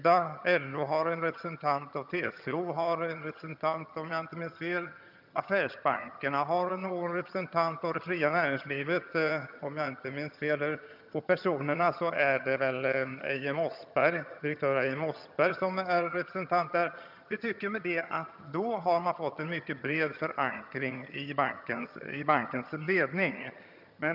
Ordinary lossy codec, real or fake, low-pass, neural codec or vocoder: none; fake; 5.4 kHz; vocoder, 22.05 kHz, 80 mel bands, HiFi-GAN